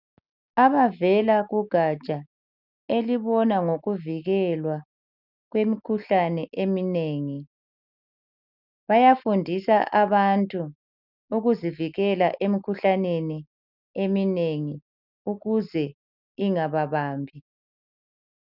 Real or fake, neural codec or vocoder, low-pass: real; none; 5.4 kHz